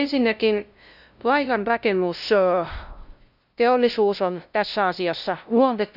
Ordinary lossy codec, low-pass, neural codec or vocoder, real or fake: none; 5.4 kHz; codec, 16 kHz, 0.5 kbps, FunCodec, trained on LibriTTS, 25 frames a second; fake